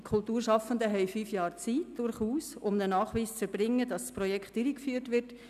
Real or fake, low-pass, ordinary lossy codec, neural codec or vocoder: real; 14.4 kHz; none; none